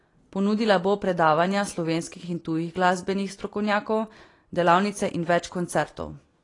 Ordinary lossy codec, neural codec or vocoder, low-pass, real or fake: AAC, 32 kbps; none; 10.8 kHz; real